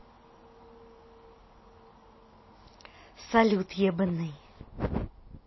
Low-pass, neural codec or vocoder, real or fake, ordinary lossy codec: 7.2 kHz; none; real; MP3, 24 kbps